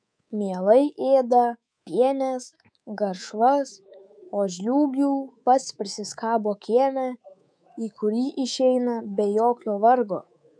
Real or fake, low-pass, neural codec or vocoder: fake; 9.9 kHz; codec, 24 kHz, 3.1 kbps, DualCodec